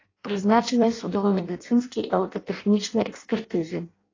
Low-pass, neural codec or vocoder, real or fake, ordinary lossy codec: 7.2 kHz; codec, 16 kHz in and 24 kHz out, 0.6 kbps, FireRedTTS-2 codec; fake; AAC, 32 kbps